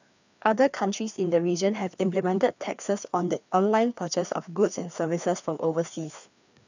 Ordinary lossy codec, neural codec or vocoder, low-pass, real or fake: none; codec, 16 kHz, 2 kbps, FreqCodec, larger model; 7.2 kHz; fake